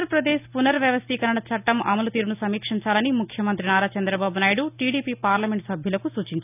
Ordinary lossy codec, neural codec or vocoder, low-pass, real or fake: none; none; 3.6 kHz; real